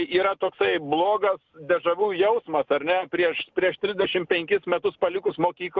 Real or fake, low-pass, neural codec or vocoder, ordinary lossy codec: real; 7.2 kHz; none; Opus, 24 kbps